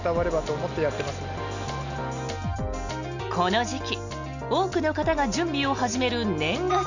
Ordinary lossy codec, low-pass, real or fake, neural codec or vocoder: none; 7.2 kHz; real; none